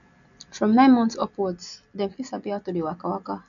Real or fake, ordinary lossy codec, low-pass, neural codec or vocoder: real; none; 7.2 kHz; none